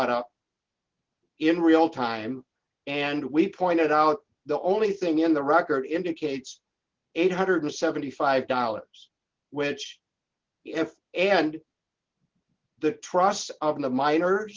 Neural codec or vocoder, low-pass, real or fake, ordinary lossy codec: none; 7.2 kHz; real; Opus, 16 kbps